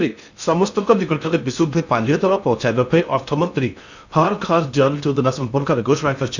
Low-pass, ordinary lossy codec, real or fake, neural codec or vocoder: 7.2 kHz; none; fake; codec, 16 kHz in and 24 kHz out, 0.6 kbps, FocalCodec, streaming, 4096 codes